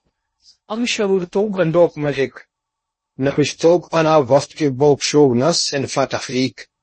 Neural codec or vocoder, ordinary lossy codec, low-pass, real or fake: codec, 16 kHz in and 24 kHz out, 0.6 kbps, FocalCodec, streaming, 2048 codes; MP3, 32 kbps; 9.9 kHz; fake